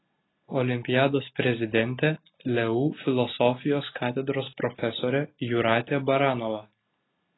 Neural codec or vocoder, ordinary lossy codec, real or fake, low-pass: none; AAC, 16 kbps; real; 7.2 kHz